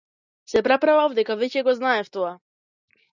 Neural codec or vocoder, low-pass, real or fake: none; 7.2 kHz; real